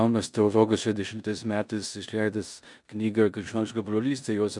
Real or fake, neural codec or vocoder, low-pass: fake; codec, 16 kHz in and 24 kHz out, 0.9 kbps, LongCat-Audio-Codec, four codebook decoder; 10.8 kHz